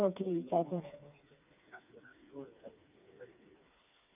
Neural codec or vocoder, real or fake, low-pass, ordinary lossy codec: codec, 16 kHz, 4 kbps, FreqCodec, smaller model; fake; 3.6 kHz; none